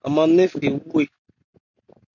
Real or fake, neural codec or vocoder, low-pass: real; none; 7.2 kHz